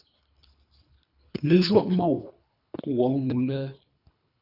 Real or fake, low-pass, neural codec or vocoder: fake; 5.4 kHz; codec, 24 kHz, 3 kbps, HILCodec